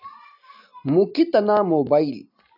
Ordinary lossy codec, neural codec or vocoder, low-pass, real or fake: AAC, 48 kbps; vocoder, 44.1 kHz, 128 mel bands every 256 samples, BigVGAN v2; 5.4 kHz; fake